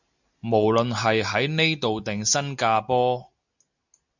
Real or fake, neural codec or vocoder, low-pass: real; none; 7.2 kHz